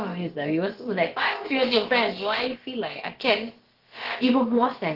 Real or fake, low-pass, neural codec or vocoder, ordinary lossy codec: fake; 5.4 kHz; codec, 16 kHz, about 1 kbps, DyCAST, with the encoder's durations; Opus, 16 kbps